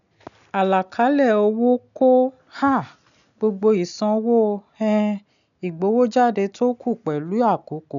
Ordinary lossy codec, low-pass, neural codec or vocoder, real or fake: none; 7.2 kHz; none; real